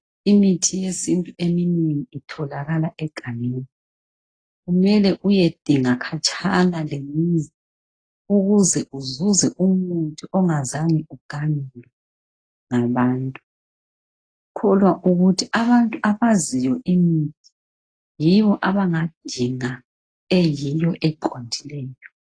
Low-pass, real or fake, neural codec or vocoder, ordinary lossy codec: 9.9 kHz; real; none; AAC, 32 kbps